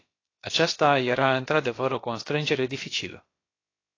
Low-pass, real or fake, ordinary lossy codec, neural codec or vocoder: 7.2 kHz; fake; AAC, 32 kbps; codec, 16 kHz, about 1 kbps, DyCAST, with the encoder's durations